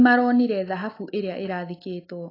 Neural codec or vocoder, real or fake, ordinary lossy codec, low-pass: none; real; AAC, 24 kbps; 5.4 kHz